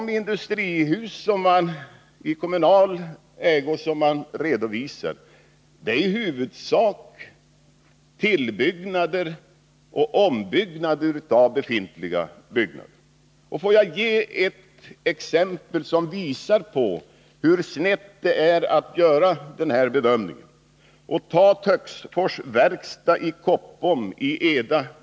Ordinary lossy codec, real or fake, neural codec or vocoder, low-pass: none; real; none; none